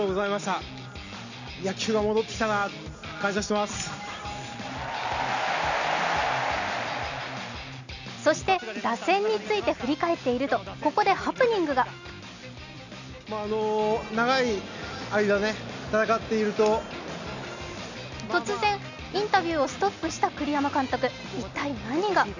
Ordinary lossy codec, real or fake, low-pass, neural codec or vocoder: none; real; 7.2 kHz; none